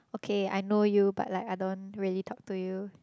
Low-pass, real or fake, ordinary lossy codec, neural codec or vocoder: none; real; none; none